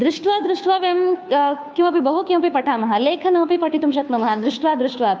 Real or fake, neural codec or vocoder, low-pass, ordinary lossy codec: fake; codec, 16 kHz, 2 kbps, FunCodec, trained on Chinese and English, 25 frames a second; none; none